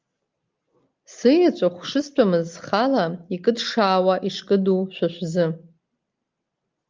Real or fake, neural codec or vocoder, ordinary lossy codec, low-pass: real; none; Opus, 24 kbps; 7.2 kHz